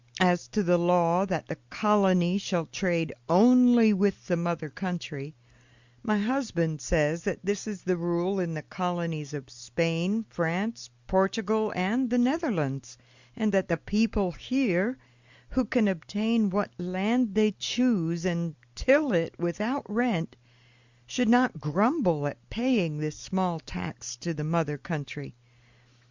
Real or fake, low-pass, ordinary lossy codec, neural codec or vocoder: real; 7.2 kHz; Opus, 64 kbps; none